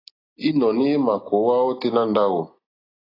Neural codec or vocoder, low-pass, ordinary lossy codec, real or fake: none; 5.4 kHz; AAC, 24 kbps; real